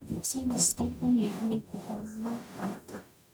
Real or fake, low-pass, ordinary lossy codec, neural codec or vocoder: fake; none; none; codec, 44.1 kHz, 0.9 kbps, DAC